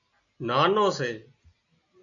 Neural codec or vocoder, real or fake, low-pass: none; real; 7.2 kHz